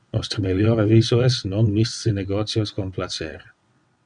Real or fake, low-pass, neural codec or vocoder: fake; 9.9 kHz; vocoder, 22.05 kHz, 80 mel bands, WaveNeXt